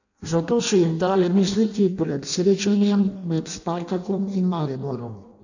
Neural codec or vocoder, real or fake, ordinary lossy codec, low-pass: codec, 16 kHz in and 24 kHz out, 0.6 kbps, FireRedTTS-2 codec; fake; none; 7.2 kHz